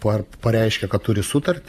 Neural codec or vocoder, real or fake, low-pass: vocoder, 44.1 kHz, 128 mel bands every 512 samples, BigVGAN v2; fake; 14.4 kHz